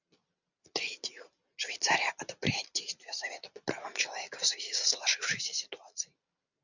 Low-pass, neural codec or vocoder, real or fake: 7.2 kHz; none; real